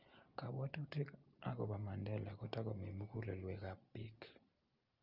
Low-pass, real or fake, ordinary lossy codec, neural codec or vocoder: 5.4 kHz; real; Opus, 24 kbps; none